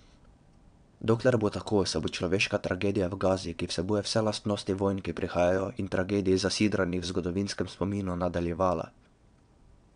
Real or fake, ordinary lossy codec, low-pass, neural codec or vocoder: fake; none; 9.9 kHz; vocoder, 22.05 kHz, 80 mel bands, WaveNeXt